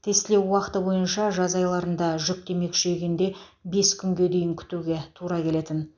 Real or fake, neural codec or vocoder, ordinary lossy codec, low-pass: real; none; none; 7.2 kHz